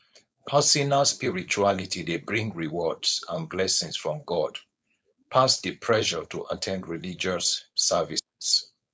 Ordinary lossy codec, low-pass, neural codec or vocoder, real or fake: none; none; codec, 16 kHz, 4.8 kbps, FACodec; fake